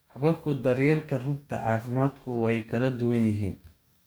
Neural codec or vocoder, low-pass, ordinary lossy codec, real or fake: codec, 44.1 kHz, 2.6 kbps, DAC; none; none; fake